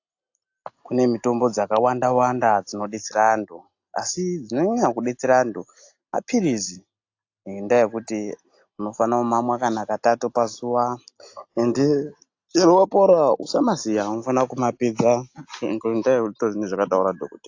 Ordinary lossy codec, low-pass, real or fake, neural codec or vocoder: AAC, 48 kbps; 7.2 kHz; real; none